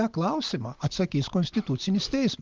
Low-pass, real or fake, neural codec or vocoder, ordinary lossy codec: 7.2 kHz; real; none; Opus, 32 kbps